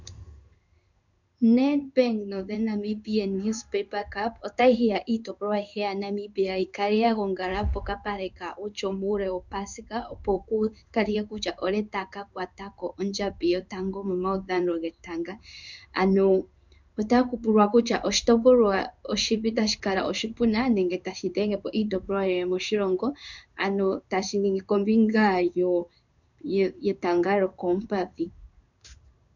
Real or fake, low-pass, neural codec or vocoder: fake; 7.2 kHz; codec, 16 kHz in and 24 kHz out, 1 kbps, XY-Tokenizer